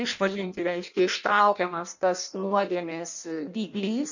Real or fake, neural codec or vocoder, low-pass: fake; codec, 16 kHz in and 24 kHz out, 0.6 kbps, FireRedTTS-2 codec; 7.2 kHz